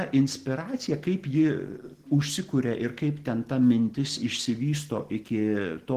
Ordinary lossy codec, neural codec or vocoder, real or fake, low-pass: Opus, 16 kbps; none; real; 14.4 kHz